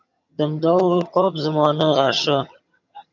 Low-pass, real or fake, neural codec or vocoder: 7.2 kHz; fake; vocoder, 22.05 kHz, 80 mel bands, HiFi-GAN